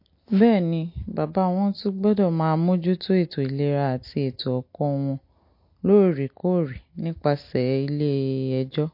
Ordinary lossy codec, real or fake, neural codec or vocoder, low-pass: MP3, 32 kbps; real; none; 5.4 kHz